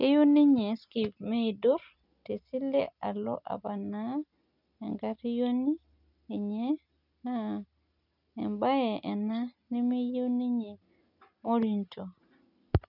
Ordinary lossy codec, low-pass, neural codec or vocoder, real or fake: none; 5.4 kHz; none; real